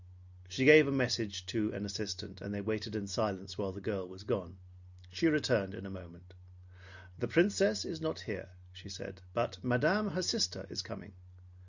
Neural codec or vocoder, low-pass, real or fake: none; 7.2 kHz; real